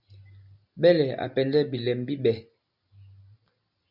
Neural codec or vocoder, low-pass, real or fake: none; 5.4 kHz; real